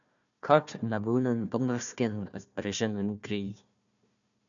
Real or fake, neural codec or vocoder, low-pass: fake; codec, 16 kHz, 1 kbps, FunCodec, trained on Chinese and English, 50 frames a second; 7.2 kHz